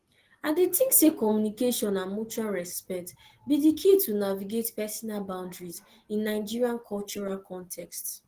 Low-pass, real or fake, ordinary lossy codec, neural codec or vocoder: 14.4 kHz; real; Opus, 16 kbps; none